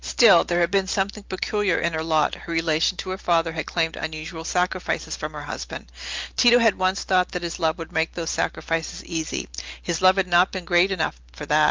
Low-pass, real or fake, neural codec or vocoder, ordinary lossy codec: 7.2 kHz; real; none; Opus, 32 kbps